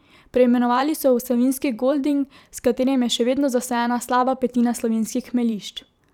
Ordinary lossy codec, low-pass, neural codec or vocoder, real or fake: none; 19.8 kHz; vocoder, 44.1 kHz, 128 mel bands every 512 samples, BigVGAN v2; fake